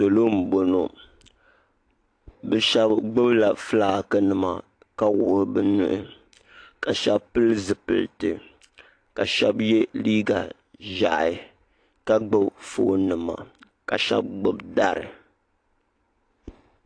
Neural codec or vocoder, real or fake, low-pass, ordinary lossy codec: none; real; 9.9 kHz; AAC, 48 kbps